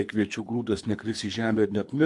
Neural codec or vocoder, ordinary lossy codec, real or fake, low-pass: codec, 24 kHz, 3 kbps, HILCodec; MP3, 64 kbps; fake; 10.8 kHz